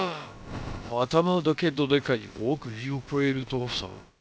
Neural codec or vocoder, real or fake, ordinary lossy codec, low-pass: codec, 16 kHz, about 1 kbps, DyCAST, with the encoder's durations; fake; none; none